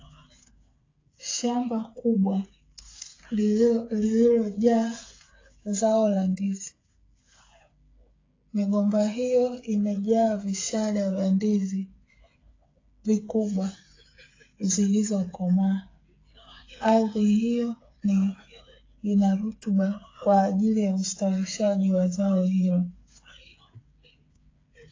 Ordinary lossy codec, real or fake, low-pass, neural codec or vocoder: AAC, 32 kbps; fake; 7.2 kHz; codec, 16 kHz, 8 kbps, FreqCodec, smaller model